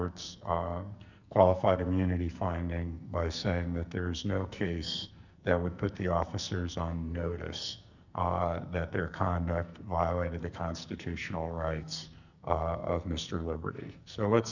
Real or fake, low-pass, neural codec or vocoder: fake; 7.2 kHz; codec, 44.1 kHz, 2.6 kbps, SNAC